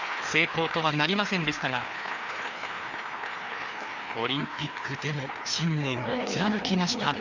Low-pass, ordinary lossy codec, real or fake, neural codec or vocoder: 7.2 kHz; none; fake; codec, 16 kHz, 2 kbps, FreqCodec, larger model